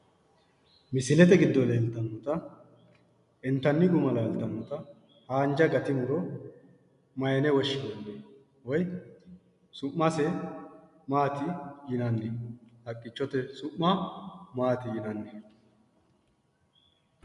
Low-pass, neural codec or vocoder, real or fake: 10.8 kHz; none; real